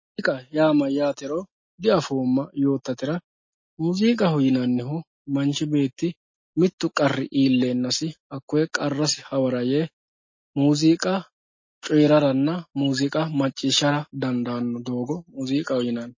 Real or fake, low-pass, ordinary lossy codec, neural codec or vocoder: real; 7.2 kHz; MP3, 32 kbps; none